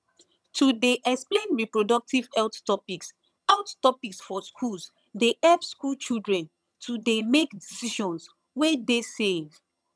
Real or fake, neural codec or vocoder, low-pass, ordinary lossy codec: fake; vocoder, 22.05 kHz, 80 mel bands, HiFi-GAN; none; none